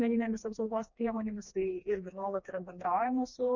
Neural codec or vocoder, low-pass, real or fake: codec, 16 kHz, 2 kbps, FreqCodec, smaller model; 7.2 kHz; fake